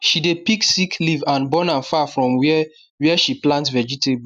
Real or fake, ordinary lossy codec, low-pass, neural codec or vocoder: real; none; 14.4 kHz; none